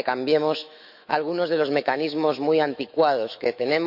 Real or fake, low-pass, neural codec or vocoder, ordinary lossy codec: fake; 5.4 kHz; autoencoder, 48 kHz, 128 numbers a frame, DAC-VAE, trained on Japanese speech; none